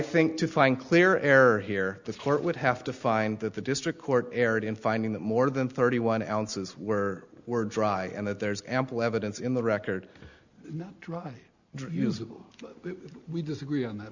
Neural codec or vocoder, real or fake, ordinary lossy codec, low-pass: none; real; Opus, 64 kbps; 7.2 kHz